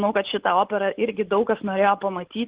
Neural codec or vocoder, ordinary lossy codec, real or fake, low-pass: none; Opus, 16 kbps; real; 3.6 kHz